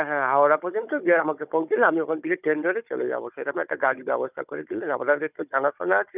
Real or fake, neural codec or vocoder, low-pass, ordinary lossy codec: fake; codec, 16 kHz, 16 kbps, FunCodec, trained on Chinese and English, 50 frames a second; 3.6 kHz; none